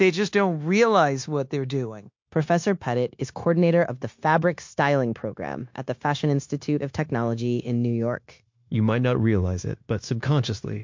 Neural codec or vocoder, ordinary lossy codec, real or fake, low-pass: codec, 16 kHz, 0.9 kbps, LongCat-Audio-Codec; MP3, 48 kbps; fake; 7.2 kHz